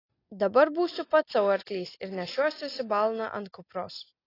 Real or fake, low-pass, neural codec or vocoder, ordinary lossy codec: real; 5.4 kHz; none; AAC, 24 kbps